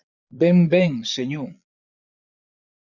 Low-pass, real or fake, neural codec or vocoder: 7.2 kHz; fake; vocoder, 22.05 kHz, 80 mel bands, Vocos